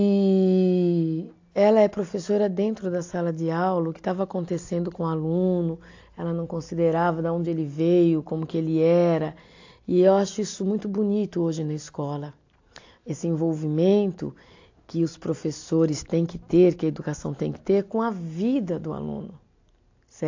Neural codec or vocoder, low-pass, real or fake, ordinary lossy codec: none; 7.2 kHz; real; AAC, 48 kbps